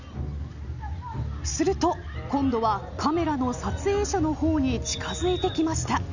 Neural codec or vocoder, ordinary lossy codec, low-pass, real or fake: none; none; 7.2 kHz; real